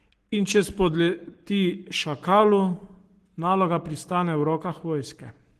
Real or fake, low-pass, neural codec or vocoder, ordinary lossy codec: fake; 14.4 kHz; codec, 44.1 kHz, 7.8 kbps, Pupu-Codec; Opus, 16 kbps